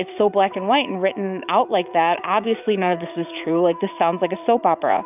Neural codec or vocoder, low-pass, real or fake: vocoder, 44.1 kHz, 80 mel bands, Vocos; 3.6 kHz; fake